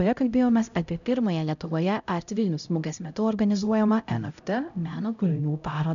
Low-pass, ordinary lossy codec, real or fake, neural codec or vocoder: 7.2 kHz; MP3, 96 kbps; fake; codec, 16 kHz, 0.5 kbps, X-Codec, HuBERT features, trained on LibriSpeech